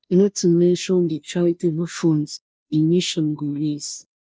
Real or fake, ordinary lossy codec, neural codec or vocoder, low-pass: fake; none; codec, 16 kHz, 0.5 kbps, FunCodec, trained on Chinese and English, 25 frames a second; none